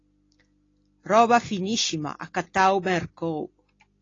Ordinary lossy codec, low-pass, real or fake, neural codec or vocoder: AAC, 32 kbps; 7.2 kHz; real; none